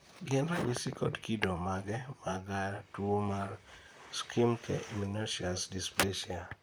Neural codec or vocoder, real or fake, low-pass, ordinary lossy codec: vocoder, 44.1 kHz, 128 mel bands, Pupu-Vocoder; fake; none; none